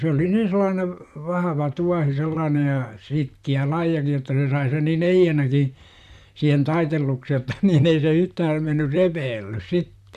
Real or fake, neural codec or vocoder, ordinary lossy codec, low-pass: fake; vocoder, 44.1 kHz, 128 mel bands, Pupu-Vocoder; none; 14.4 kHz